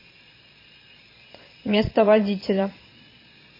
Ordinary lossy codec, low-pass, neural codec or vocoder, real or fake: MP3, 24 kbps; 5.4 kHz; vocoder, 22.05 kHz, 80 mel bands, Vocos; fake